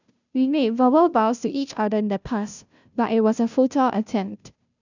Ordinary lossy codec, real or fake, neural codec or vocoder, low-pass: none; fake; codec, 16 kHz, 0.5 kbps, FunCodec, trained on Chinese and English, 25 frames a second; 7.2 kHz